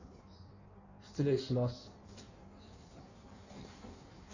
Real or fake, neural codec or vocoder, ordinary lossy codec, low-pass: fake; codec, 16 kHz in and 24 kHz out, 1.1 kbps, FireRedTTS-2 codec; none; 7.2 kHz